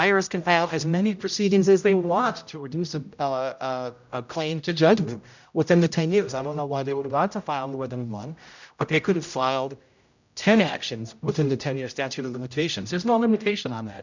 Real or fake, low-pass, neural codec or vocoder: fake; 7.2 kHz; codec, 16 kHz, 0.5 kbps, X-Codec, HuBERT features, trained on general audio